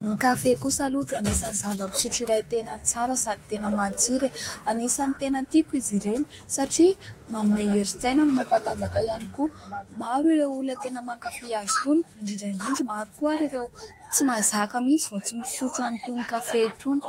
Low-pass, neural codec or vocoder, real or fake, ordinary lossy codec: 19.8 kHz; autoencoder, 48 kHz, 32 numbers a frame, DAC-VAE, trained on Japanese speech; fake; AAC, 48 kbps